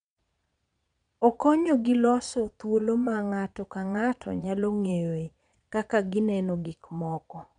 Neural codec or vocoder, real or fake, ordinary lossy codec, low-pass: vocoder, 22.05 kHz, 80 mel bands, Vocos; fake; none; 9.9 kHz